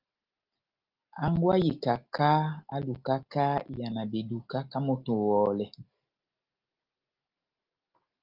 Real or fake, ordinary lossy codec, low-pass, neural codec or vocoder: real; Opus, 32 kbps; 5.4 kHz; none